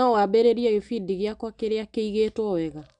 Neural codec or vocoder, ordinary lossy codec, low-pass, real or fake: none; none; 9.9 kHz; real